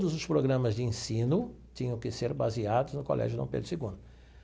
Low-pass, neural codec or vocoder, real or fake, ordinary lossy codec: none; none; real; none